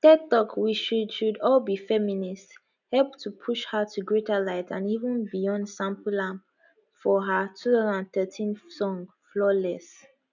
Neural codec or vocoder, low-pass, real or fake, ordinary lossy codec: none; 7.2 kHz; real; none